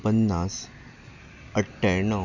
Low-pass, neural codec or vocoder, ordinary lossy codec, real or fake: 7.2 kHz; none; none; real